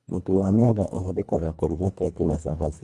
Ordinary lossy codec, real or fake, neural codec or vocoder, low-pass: none; fake; codec, 24 kHz, 1.5 kbps, HILCodec; none